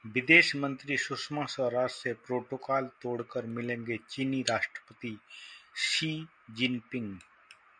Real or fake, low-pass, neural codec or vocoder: real; 9.9 kHz; none